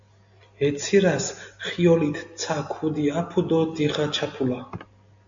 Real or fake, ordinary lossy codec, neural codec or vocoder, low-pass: real; AAC, 64 kbps; none; 7.2 kHz